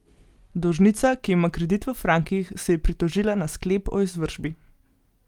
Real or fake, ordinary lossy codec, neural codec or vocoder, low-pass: real; Opus, 32 kbps; none; 19.8 kHz